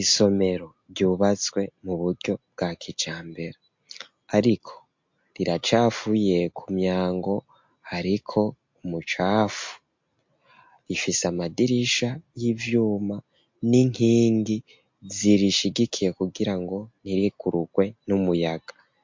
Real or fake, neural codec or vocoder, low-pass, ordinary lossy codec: real; none; 7.2 kHz; MP3, 48 kbps